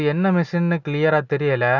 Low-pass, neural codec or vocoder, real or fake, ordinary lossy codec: 7.2 kHz; none; real; none